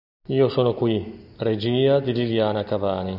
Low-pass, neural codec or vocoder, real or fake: 5.4 kHz; none; real